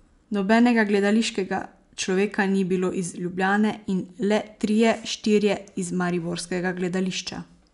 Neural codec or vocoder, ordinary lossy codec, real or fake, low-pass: none; none; real; 10.8 kHz